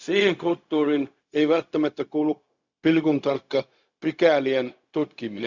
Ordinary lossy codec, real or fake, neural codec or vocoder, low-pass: Opus, 64 kbps; fake; codec, 16 kHz, 0.4 kbps, LongCat-Audio-Codec; 7.2 kHz